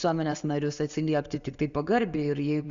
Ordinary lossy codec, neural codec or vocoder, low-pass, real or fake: Opus, 64 kbps; none; 7.2 kHz; real